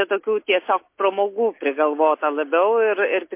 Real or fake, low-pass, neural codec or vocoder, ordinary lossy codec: real; 3.6 kHz; none; MP3, 24 kbps